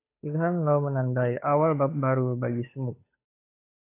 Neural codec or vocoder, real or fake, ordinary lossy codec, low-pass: codec, 16 kHz, 8 kbps, FunCodec, trained on Chinese and English, 25 frames a second; fake; AAC, 24 kbps; 3.6 kHz